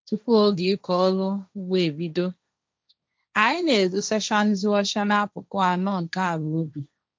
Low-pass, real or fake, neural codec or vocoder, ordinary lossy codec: none; fake; codec, 16 kHz, 1.1 kbps, Voila-Tokenizer; none